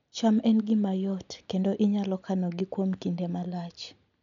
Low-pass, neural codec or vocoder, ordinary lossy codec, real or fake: 7.2 kHz; none; none; real